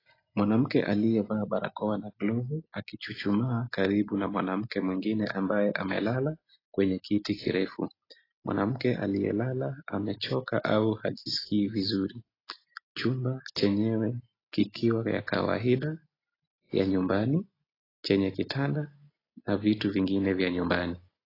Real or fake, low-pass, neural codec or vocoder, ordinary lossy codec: real; 5.4 kHz; none; AAC, 24 kbps